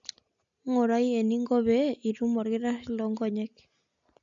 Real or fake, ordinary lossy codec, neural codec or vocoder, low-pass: real; MP3, 64 kbps; none; 7.2 kHz